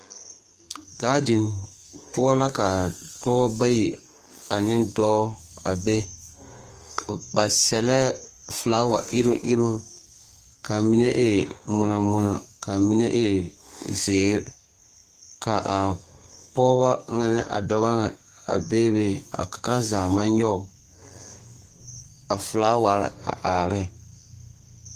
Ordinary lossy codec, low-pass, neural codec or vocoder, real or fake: Opus, 24 kbps; 14.4 kHz; codec, 32 kHz, 1.9 kbps, SNAC; fake